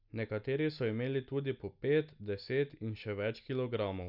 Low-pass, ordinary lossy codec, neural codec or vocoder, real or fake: 5.4 kHz; none; none; real